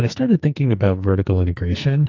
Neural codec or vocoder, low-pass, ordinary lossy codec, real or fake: codec, 44.1 kHz, 2.6 kbps, SNAC; 7.2 kHz; AAC, 48 kbps; fake